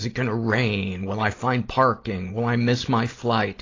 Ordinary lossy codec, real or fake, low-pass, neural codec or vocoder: AAC, 32 kbps; fake; 7.2 kHz; vocoder, 44.1 kHz, 128 mel bands every 256 samples, BigVGAN v2